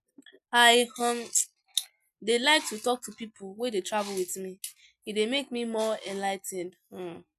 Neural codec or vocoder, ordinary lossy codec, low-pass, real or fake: none; none; 14.4 kHz; real